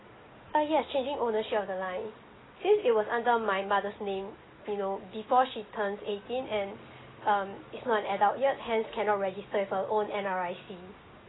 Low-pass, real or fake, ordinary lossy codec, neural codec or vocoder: 7.2 kHz; real; AAC, 16 kbps; none